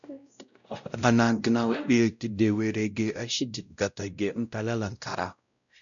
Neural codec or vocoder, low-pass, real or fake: codec, 16 kHz, 0.5 kbps, X-Codec, WavLM features, trained on Multilingual LibriSpeech; 7.2 kHz; fake